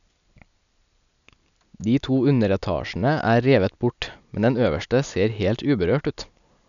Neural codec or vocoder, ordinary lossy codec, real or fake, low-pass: none; none; real; 7.2 kHz